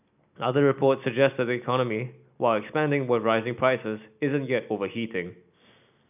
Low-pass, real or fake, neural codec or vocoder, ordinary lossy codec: 3.6 kHz; real; none; none